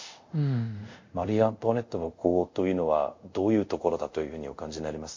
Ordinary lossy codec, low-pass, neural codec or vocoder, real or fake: MP3, 48 kbps; 7.2 kHz; codec, 24 kHz, 0.5 kbps, DualCodec; fake